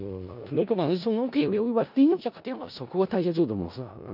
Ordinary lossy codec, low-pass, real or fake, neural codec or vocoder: none; 5.4 kHz; fake; codec, 16 kHz in and 24 kHz out, 0.4 kbps, LongCat-Audio-Codec, four codebook decoder